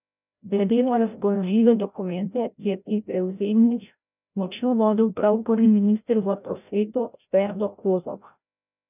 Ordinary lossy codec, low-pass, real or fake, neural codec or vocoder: none; 3.6 kHz; fake; codec, 16 kHz, 0.5 kbps, FreqCodec, larger model